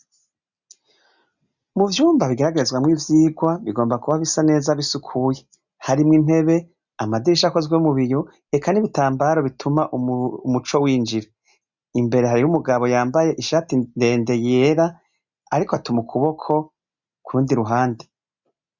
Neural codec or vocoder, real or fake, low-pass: none; real; 7.2 kHz